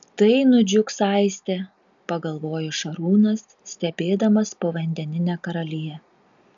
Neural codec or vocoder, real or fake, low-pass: none; real; 7.2 kHz